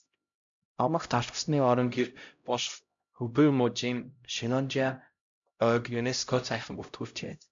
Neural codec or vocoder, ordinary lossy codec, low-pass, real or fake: codec, 16 kHz, 0.5 kbps, X-Codec, HuBERT features, trained on LibriSpeech; MP3, 64 kbps; 7.2 kHz; fake